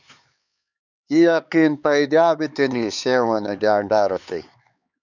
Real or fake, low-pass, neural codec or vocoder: fake; 7.2 kHz; codec, 16 kHz, 4 kbps, X-Codec, HuBERT features, trained on LibriSpeech